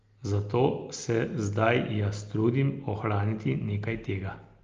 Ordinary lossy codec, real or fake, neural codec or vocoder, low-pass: Opus, 32 kbps; real; none; 7.2 kHz